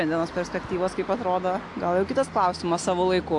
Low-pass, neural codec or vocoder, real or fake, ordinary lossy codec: 10.8 kHz; none; real; AAC, 64 kbps